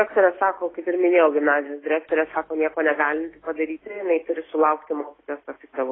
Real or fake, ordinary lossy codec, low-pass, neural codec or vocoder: fake; AAC, 16 kbps; 7.2 kHz; autoencoder, 48 kHz, 128 numbers a frame, DAC-VAE, trained on Japanese speech